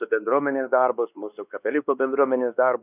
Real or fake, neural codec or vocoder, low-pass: fake; codec, 16 kHz, 2 kbps, X-Codec, WavLM features, trained on Multilingual LibriSpeech; 3.6 kHz